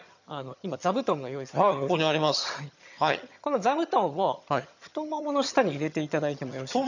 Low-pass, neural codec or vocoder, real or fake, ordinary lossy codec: 7.2 kHz; vocoder, 22.05 kHz, 80 mel bands, HiFi-GAN; fake; none